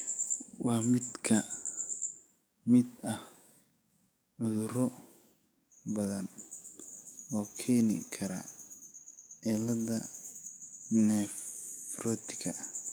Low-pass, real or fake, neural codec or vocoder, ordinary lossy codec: none; fake; codec, 44.1 kHz, 7.8 kbps, DAC; none